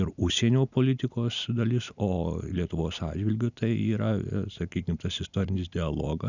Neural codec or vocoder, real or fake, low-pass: none; real; 7.2 kHz